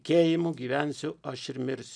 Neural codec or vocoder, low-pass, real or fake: none; 9.9 kHz; real